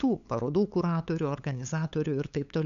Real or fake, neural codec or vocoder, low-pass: fake; codec, 16 kHz, 8 kbps, FunCodec, trained on LibriTTS, 25 frames a second; 7.2 kHz